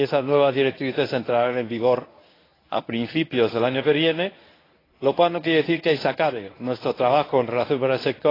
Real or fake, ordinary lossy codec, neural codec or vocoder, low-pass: fake; AAC, 24 kbps; codec, 24 kHz, 0.9 kbps, WavTokenizer, medium speech release version 1; 5.4 kHz